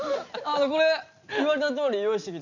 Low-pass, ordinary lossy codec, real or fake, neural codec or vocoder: 7.2 kHz; none; real; none